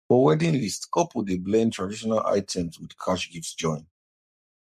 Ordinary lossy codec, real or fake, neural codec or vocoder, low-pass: MP3, 64 kbps; fake; codec, 44.1 kHz, 7.8 kbps, Pupu-Codec; 14.4 kHz